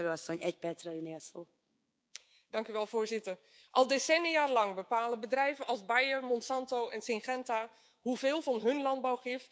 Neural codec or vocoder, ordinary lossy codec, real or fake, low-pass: codec, 16 kHz, 6 kbps, DAC; none; fake; none